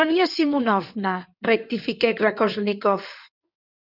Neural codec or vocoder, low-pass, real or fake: codec, 16 kHz in and 24 kHz out, 2.2 kbps, FireRedTTS-2 codec; 5.4 kHz; fake